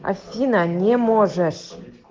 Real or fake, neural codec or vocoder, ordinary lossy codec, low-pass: real; none; Opus, 16 kbps; 7.2 kHz